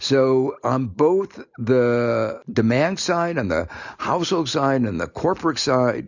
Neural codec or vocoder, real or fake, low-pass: none; real; 7.2 kHz